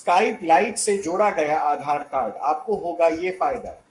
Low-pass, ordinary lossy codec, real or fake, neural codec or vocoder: 10.8 kHz; MP3, 64 kbps; fake; codec, 44.1 kHz, 7.8 kbps, Pupu-Codec